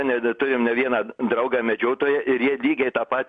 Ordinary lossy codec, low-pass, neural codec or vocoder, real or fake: MP3, 64 kbps; 9.9 kHz; none; real